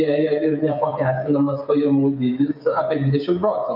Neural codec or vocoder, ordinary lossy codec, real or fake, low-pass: codec, 16 kHz, 8 kbps, FreqCodec, smaller model; MP3, 48 kbps; fake; 5.4 kHz